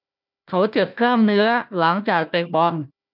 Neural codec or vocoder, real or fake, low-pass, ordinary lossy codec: codec, 16 kHz, 1 kbps, FunCodec, trained on Chinese and English, 50 frames a second; fake; 5.4 kHz; none